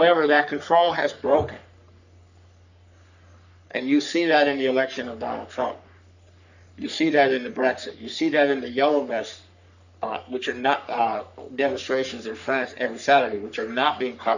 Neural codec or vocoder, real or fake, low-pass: codec, 44.1 kHz, 3.4 kbps, Pupu-Codec; fake; 7.2 kHz